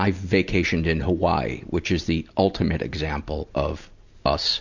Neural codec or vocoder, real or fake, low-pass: none; real; 7.2 kHz